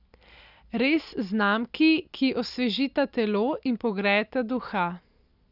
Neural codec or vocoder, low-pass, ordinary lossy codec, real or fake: none; 5.4 kHz; none; real